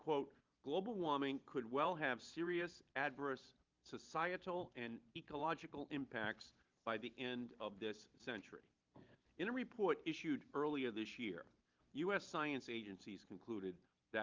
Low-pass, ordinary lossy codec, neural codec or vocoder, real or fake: 7.2 kHz; Opus, 32 kbps; vocoder, 44.1 kHz, 128 mel bands every 512 samples, BigVGAN v2; fake